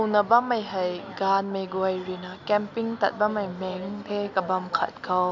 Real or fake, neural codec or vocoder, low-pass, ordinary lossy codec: real; none; 7.2 kHz; MP3, 64 kbps